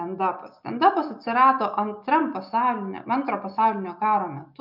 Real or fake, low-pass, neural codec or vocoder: real; 5.4 kHz; none